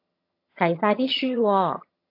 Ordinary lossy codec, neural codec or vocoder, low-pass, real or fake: AAC, 32 kbps; vocoder, 22.05 kHz, 80 mel bands, HiFi-GAN; 5.4 kHz; fake